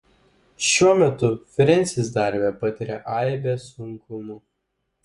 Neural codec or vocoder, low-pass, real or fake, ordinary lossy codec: vocoder, 24 kHz, 100 mel bands, Vocos; 10.8 kHz; fake; Opus, 64 kbps